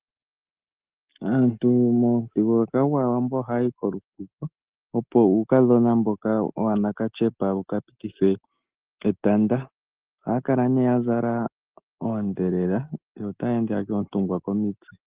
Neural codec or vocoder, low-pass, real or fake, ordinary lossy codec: none; 3.6 kHz; real; Opus, 16 kbps